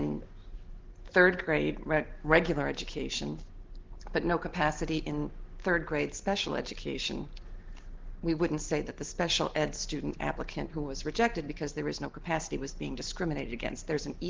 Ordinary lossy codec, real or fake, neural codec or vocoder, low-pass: Opus, 16 kbps; real; none; 7.2 kHz